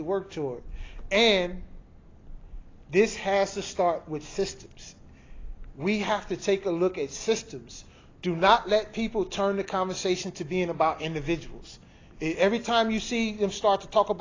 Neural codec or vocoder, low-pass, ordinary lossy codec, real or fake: none; 7.2 kHz; AAC, 32 kbps; real